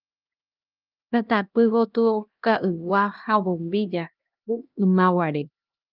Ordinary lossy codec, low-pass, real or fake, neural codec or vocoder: Opus, 24 kbps; 5.4 kHz; fake; codec, 16 kHz, 1 kbps, X-Codec, HuBERT features, trained on LibriSpeech